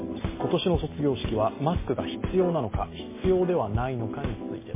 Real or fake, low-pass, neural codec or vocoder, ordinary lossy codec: real; 3.6 kHz; none; MP3, 16 kbps